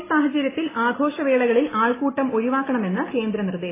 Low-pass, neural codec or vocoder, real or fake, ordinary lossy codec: 3.6 kHz; none; real; AAC, 16 kbps